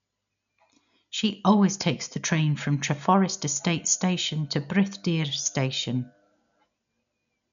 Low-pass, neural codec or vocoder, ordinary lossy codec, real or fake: 7.2 kHz; none; none; real